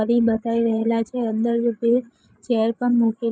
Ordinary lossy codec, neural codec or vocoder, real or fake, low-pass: none; codec, 16 kHz, 8 kbps, FreqCodec, larger model; fake; none